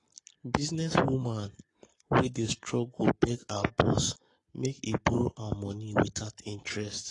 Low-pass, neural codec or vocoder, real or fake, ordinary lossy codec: 10.8 kHz; codec, 44.1 kHz, 7.8 kbps, Pupu-Codec; fake; AAC, 32 kbps